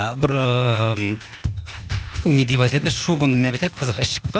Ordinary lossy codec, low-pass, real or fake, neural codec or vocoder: none; none; fake; codec, 16 kHz, 0.8 kbps, ZipCodec